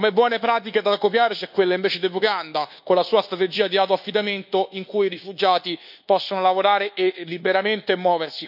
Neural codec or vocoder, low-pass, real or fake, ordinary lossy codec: codec, 24 kHz, 1.2 kbps, DualCodec; 5.4 kHz; fake; none